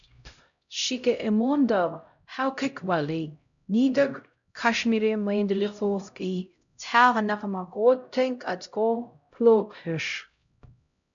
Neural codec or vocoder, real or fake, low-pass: codec, 16 kHz, 0.5 kbps, X-Codec, HuBERT features, trained on LibriSpeech; fake; 7.2 kHz